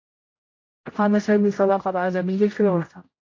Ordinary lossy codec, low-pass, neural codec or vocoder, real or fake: AAC, 32 kbps; 7.2 kHz; codec, 16 kHz, 0.5 kbps, X-Codec, HuBERT features, trained on general audio; fake